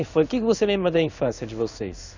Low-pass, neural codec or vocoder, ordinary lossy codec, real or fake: 7.2 kHz; codec, 16 kHz in and 24 kHz out, 1 kbps, XY-Tokenizer; none; fake